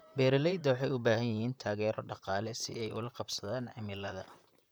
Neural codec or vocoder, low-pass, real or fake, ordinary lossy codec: vocoder, 44.1 kHz, 128 mel bands, Pupu-Vocoder; none; fake; none